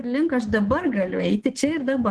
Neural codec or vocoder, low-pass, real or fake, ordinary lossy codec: codec, 24 kHz, 3.1 kbps, DualCodec; 10.8 kHz; fake; Opus, 16 kbps